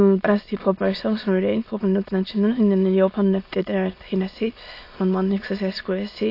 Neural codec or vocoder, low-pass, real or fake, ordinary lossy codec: autoencoder, 22.05 kHz, a latent of 192 numbers a frame, VITS, trained on many speakers; 5.4 kHz; fake; AAC, 32 kbps